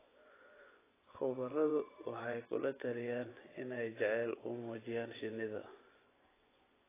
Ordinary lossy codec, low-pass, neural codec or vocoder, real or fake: AAC, 16 kbps; 3.6 kHz; vocoder, 24 kHz, 100 mel bands, Vocos; fake